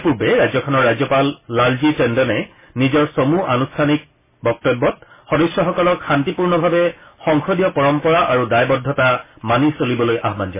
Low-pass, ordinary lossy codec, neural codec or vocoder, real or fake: 3.6 kHz; MP3, 16 kbps; none; real